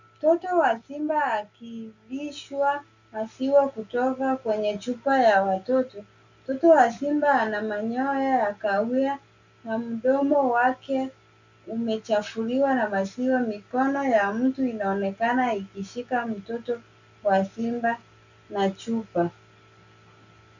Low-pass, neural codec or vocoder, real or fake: 7.2 kHz; none; real